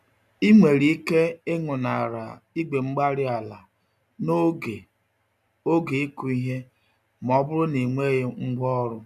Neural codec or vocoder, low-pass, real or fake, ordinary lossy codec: none; 14.4 kHz; real; none